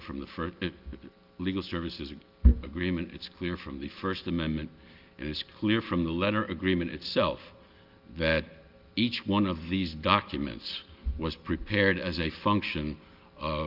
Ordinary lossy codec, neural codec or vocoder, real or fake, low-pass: Opus, 24 kbps; none; real; 5.4 kHz